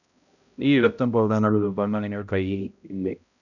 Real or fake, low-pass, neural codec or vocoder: fake; 7.2 kHz; codec, 16 kHz, 0.5 kbps, X-Codec, HuBERT features, trained on balanced general audio